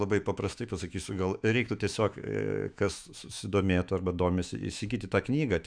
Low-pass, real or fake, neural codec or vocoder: 9.9 kHz; fake; autoencoder, 48 kHz, 128 numbers a frame, DAC-VAE, trained on Japanese speech